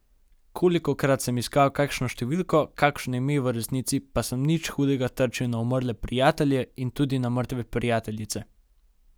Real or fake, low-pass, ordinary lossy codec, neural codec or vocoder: real; none; none; none